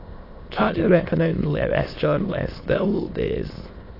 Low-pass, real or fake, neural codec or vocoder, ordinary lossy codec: 5.4 kHz; fake; autoencoder, 22.05 kHz, a latent of 192 numbers a frame, VITS, trained on many speakers; AAC, 32 kbps